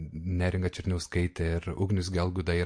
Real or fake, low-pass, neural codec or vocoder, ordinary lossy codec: real; 9.9 kHz; none; MP3, 48 kbps